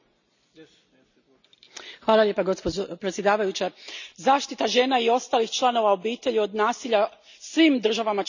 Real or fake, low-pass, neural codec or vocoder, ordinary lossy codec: real; 7.2 kHz; none; none